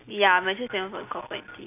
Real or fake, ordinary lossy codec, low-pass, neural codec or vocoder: real; none; 3.6 kHz; none